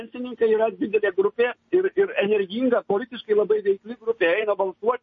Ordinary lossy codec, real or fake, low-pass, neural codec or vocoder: MP3, 32 kbps; real; 7.2 kHz; none